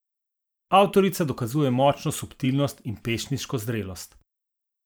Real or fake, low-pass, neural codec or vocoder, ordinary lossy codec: fake; none; vocoder, 44.1 kHz, 128 mel bands every 512 samples, BigVGAN v2; none